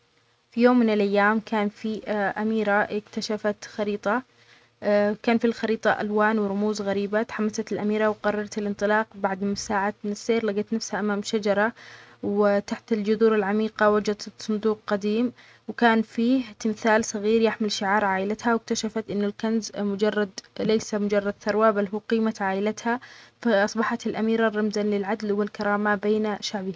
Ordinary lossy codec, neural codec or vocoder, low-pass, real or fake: none; none; none; real